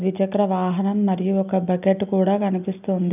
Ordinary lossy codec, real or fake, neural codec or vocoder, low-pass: none; real; none; 3.6 kHz